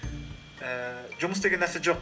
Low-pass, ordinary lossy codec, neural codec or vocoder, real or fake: none; none; none; real